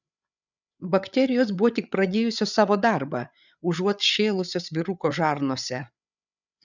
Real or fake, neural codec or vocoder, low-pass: fake; codec, 16 kHz, 8 kbps, FreqCodec, larger model; 7.2 kHz